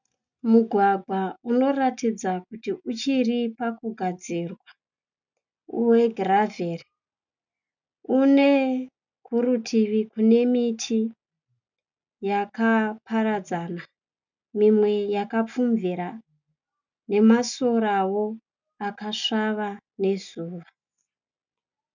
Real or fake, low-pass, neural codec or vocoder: real; 7.2 kHz; none